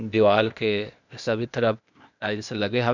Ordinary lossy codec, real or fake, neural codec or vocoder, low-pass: none; fake; codec, 16 kHz, 0.8 kbps, ZipCodec; 7.2 kHz